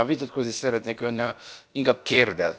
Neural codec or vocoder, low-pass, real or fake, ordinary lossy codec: codec, 16 kHz, about 1 kbps, DyCAST, with the encoder's durations; none; fake; none